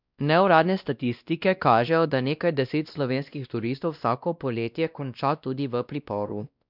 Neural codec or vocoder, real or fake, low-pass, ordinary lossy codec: codec, 16 kHz, 1 kbps, X-Codec, WavLM features, trained on Multilingual LibriSpeech; fake; 5.4 kHz; none